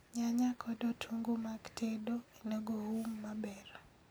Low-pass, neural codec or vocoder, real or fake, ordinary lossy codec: none; none; real; none